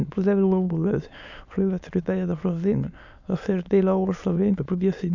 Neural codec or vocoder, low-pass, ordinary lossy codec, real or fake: autoencoder, 22.05 kHz, a latent of 192 numbers a frame, VITS, trained on many speakers; 7.2 kHz; none; fake